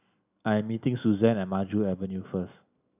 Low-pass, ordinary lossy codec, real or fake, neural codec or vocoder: 3.6 kHz; none; real; none